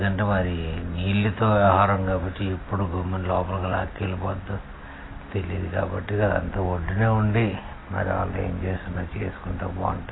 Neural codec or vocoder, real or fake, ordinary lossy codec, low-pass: none; real; AAC, 16 kbps; 7.2 kHz